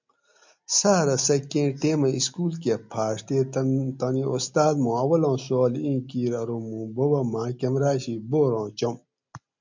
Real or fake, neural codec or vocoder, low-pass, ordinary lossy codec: real; none; 7.2 kHz; MP3, 64 kbps